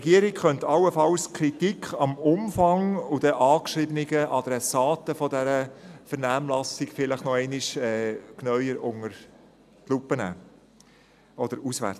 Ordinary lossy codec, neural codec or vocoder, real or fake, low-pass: AAC, 96 kbps; none; real; 14.4 kHz